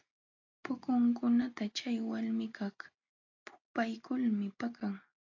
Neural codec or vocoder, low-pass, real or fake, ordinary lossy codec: none; 7.2 kHz; real; AAC, 48 kbps